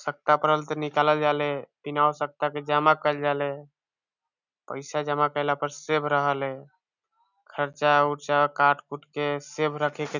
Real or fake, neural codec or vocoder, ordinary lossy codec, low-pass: real; none; none; 7.2 kHz